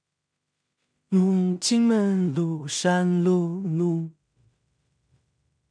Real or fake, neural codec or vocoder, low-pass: fake; codec, 16 kHz in and 24 kHz out, 0.4 kbps, LongCat-Audio-Codec, two codebook decoder; 9.9 kHz